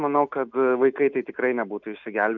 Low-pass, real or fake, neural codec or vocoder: 7.2 kHz; real; none